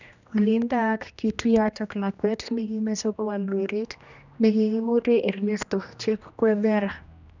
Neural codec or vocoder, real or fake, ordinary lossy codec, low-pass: codec, 16 kHz, 1 kbps, X-Codec, HuBERT features, trained on general audio; fake; none; 7.2 kHz